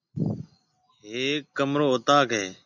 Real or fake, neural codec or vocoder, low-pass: real; none; 7.2 kHz